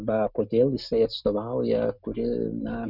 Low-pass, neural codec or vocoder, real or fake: 5.4 kHz; none; real